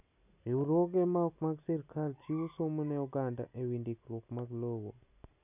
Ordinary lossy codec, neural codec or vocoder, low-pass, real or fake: none; none; 3.6 kHz; real